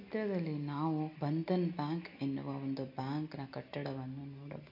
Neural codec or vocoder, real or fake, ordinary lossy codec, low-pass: none; real; MP3, 48 kbps; 5.4 kHz